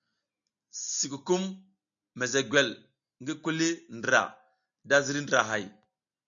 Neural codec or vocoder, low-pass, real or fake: none; 7.2 kHz; real